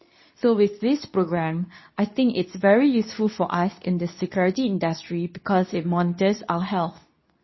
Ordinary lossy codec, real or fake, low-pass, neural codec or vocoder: MP3, 24 kbps; fake; 7.2 kHz; codec, 24 kHz, 0.9 kbps, WavTokenizer, medium speech release version 2